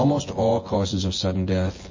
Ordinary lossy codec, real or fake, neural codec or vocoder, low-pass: MP3, 32 kbps; fake; vocoder, 24 kHz, 100 mel bands, Vocos; 7.2 kHz